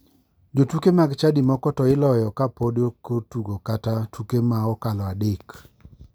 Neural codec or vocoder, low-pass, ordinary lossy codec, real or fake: none; none; none; real